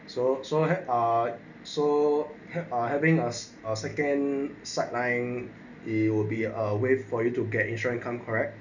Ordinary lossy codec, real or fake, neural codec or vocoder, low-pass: none; real; none; 7.2 kHz